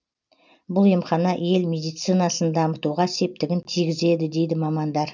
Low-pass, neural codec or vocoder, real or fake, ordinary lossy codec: 7.2 kHz; none; real; none